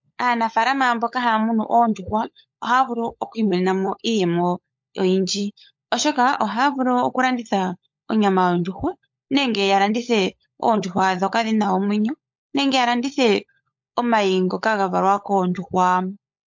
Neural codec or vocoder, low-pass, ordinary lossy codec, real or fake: codec, 16 kHz, 16 kbps, FunCodec, trained on LibriTTS, 50 frames a second; 7.2 kHz; MP3, 48 kbps; fake